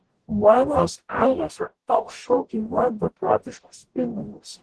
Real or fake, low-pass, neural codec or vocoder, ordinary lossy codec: fake; 10.8 kHz; codec, 44.1 kHz, 0.9 kbps, DAC; Opus, 16 kbps